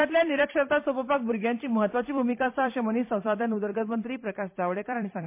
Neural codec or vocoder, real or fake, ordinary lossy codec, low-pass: vocoder, 44.1 kHz, 128 mel bands every 256 samples, BigVGAN v2; fake; MP3, 24 kbps; 3.6 kHz